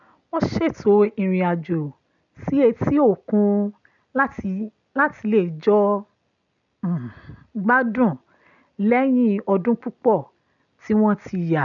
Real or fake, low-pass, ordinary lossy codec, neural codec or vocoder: real; 7.2 kHz; none; none